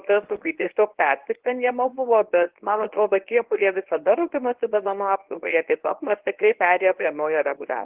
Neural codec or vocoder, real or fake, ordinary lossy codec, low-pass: codec, 24 kHz, 0.9 kbps, WavTokenizer, medium speech release version 1; fake; Opus, 16 kbps; 3.6 kHz